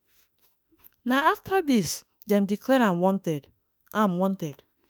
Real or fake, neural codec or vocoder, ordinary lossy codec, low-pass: fake; autoencoder, 48 kHz, 32 numbers a frame, DAC-VAE, trained on Japanese speech; none; none